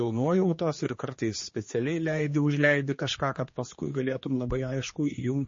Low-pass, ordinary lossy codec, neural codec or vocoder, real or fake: 7.2 kHz; MP3, 32 kbps; codec, 16 kHz, 2 kbps, X-Codec, HuBERT features, trained on general audio; fake